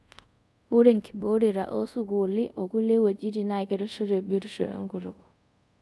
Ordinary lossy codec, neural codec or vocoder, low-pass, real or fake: none; codec, 24 kHz, 0.5 kbps, DualCodec; none; fake